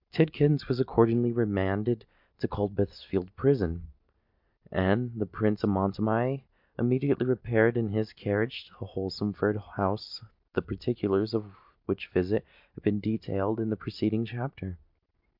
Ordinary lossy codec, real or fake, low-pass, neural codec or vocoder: MP3, 48 kbps; real; 5.4 kHz; none